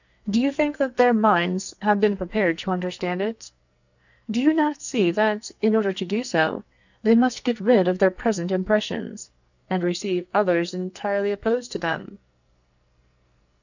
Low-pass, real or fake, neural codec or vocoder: 7.2 kHz; fake; codec, 44.1 kHz, 2.6 kbps, SNAC